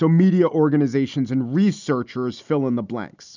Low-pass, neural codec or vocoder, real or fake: 7.2 kHz; none; real